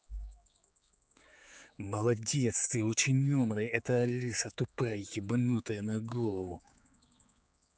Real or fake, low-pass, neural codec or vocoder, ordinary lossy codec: fake; none; codec, 16 kHz, 4 kbps, X-Codec, HuBERT features, trained on general audio; none